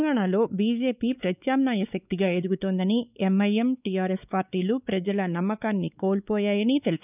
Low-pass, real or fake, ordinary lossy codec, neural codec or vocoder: 3.6 kHz; fake; none; codec, 16 kHz, 4 kbps, X-Codec, WavLM features, trained on Multilingual LibriSpeech